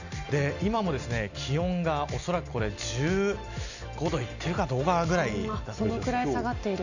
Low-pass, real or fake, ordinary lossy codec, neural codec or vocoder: 7.2 kHz; real; none; none